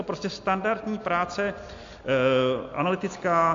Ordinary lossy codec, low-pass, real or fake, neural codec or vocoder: AAC, 48 kbps; 7.2 kHz; real; none